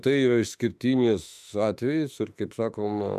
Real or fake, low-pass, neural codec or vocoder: fake; 14.4 kHz; autoencoder, 48 kHz, 32 numbers a frame, DAC-VAE, trained on Japanese speech